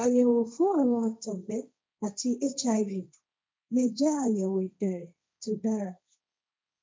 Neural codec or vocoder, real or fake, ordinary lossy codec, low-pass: codec, 16 kHz, 1.1 kbps, Voila-Tokenizer; fake; none; none